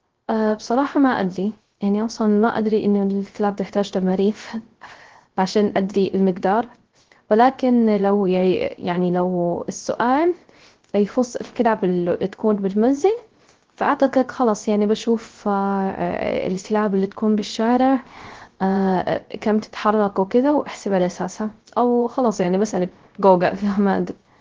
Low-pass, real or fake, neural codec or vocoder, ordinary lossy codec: 7.2 kHz; fake; codec, 16 kHz, 0.3 kbps, FocalCodec; Opus, 16 kbps